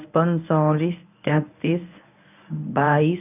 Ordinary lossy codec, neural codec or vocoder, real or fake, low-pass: none; codec, 24 kHz, 0.9 kbps, WavTokenizer, medium speech release version 1; fake; 3.6 kHz